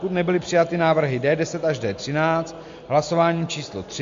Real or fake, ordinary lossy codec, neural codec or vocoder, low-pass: real; MP3, 48 kbps; none; 7.2 kHz